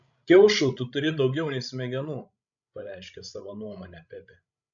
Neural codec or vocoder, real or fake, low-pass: codec, 16 kHz, 16 kbps, FreqCodec, larger model; fake; 7.2 kHz